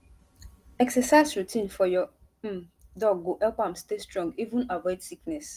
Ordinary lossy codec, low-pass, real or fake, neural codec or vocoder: Opus, 24 kbps; 14.4 kHz; real; none